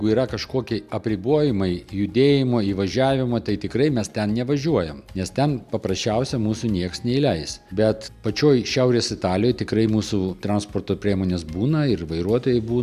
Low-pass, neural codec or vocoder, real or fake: 14.4 kHz; none; real